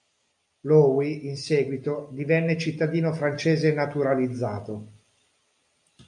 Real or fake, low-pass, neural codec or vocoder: real; 10.8 kHz; none